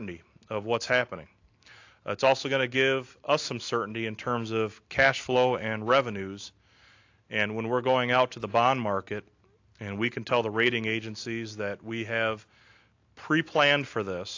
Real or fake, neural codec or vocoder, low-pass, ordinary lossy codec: real; none; 7.2 kHz; AAC, 48 kbps